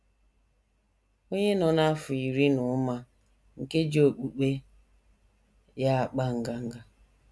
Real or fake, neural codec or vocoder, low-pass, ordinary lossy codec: real; none; none; none